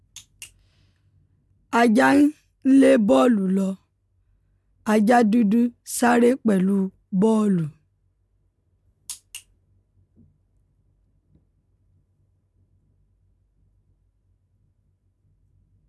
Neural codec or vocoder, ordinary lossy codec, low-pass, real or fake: none; none; none; real